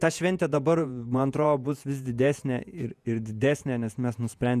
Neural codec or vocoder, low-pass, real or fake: vocoder, 48 kHz, 128 mel bands, Vocos; 14.4 kHz; fake